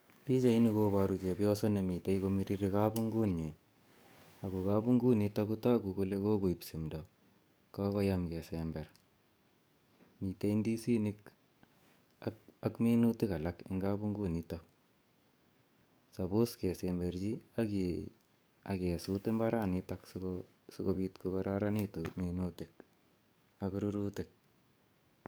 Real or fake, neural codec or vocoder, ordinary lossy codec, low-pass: fake; codec, 44.1 kHz, 7.8 kbps, DAC; none; none